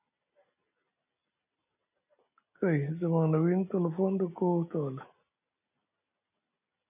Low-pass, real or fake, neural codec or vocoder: 3.6 kHz; real; none